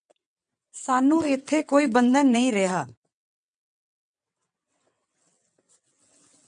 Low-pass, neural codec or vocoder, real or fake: 9.9 kHz; vocoder, 22.05 kHz, 80 mel bands, WaveNeXt; fake